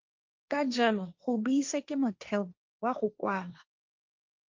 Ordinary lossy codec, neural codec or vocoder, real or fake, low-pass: Opus, 24 kbps; codec, 16 kHz, 1.1 kbps, Voila-Tokenizer; fake; 7.2 kHz